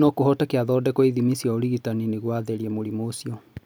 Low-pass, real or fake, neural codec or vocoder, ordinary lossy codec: none; real; none; none